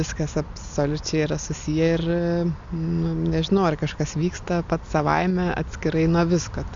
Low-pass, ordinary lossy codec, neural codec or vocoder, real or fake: 7.2 kHz; AAC, 64 kbps; none; real